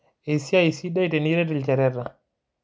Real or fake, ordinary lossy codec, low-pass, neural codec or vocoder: real; none; none; none